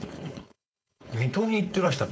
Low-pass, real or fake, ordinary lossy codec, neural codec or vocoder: none; fake; none; codec, 16 kHz, 4.8 kbps, FACodec